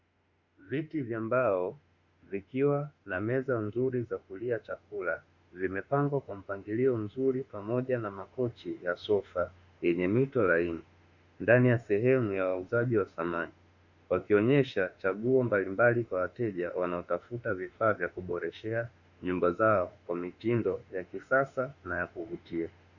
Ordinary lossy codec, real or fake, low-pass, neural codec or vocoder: Opus, 64 kbps; fake; 7.2 kHz; autoencoder, 48 kHz, 32 numbers a frame, DAC-VAE, trained on Japanese speech